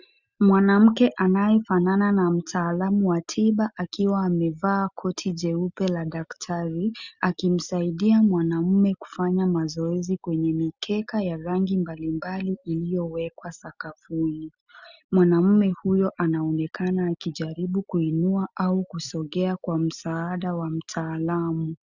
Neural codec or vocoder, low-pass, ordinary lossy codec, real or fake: none; 7.2 kHz; Opus, 64 kbps; real